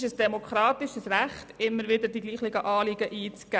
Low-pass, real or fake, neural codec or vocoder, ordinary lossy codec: none; real; none; none